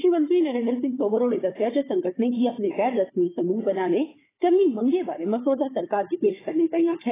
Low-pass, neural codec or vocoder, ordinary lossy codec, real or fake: 3.6 kHz; codec, 16 kHz, 4 kbps, FunCodec, trained on Chinese and English, 50 frames a second; AAC, 16 kbps; fake